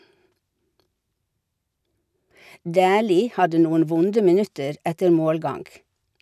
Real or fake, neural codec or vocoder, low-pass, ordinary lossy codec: real; none; 14.4 kHz; none